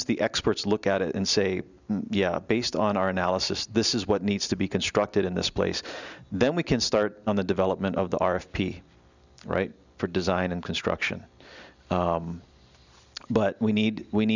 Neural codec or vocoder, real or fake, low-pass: none; real; 7.2 kHz